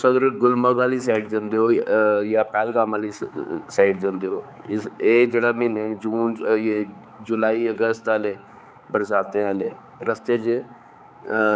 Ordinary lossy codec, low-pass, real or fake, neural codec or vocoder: none; none; fake; codec, 16 kHz, 4 kbps, X-Codec, HuBERT features, trained on balanced general audio